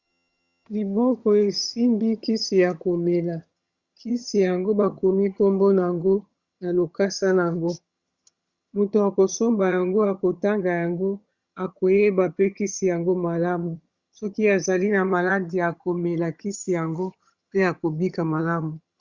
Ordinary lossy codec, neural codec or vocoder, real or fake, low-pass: Opus, 64 kbps; vocoder, 22.05 kHz, 80 mel bands, HiFi-GAN; fake; 7.2 kHz